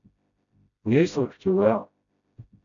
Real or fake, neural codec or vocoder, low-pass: fake; codec, 16 kHz, 0.5 kbps, FreqCodec, smaller model; 7.2 kHz